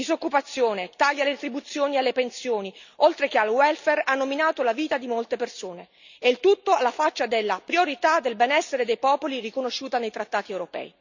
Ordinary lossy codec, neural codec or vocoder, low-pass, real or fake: none; none; 7.2 kHz; real